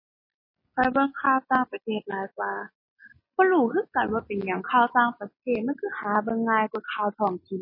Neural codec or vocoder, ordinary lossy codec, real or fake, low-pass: none; MP3, 24 kbps; real; 5.4 kHz